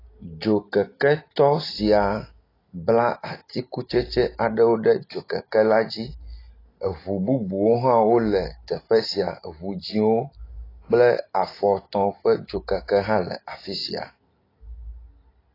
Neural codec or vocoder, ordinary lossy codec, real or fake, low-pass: none; AAC, 24 kbps; real; 5.4 kHz